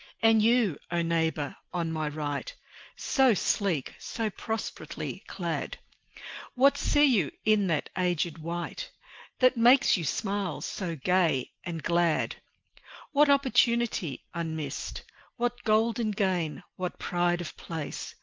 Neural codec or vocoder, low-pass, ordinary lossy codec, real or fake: none; 7.2 kHz; Opus, 32 kbps; real